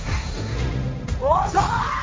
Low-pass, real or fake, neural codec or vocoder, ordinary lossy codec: none; fake; codec, 16 kHz, 1.1 kbps, Voila-Tokenizer; none